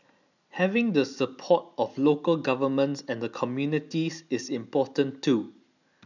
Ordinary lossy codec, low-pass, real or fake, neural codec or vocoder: none; 7.2 kHz; real; none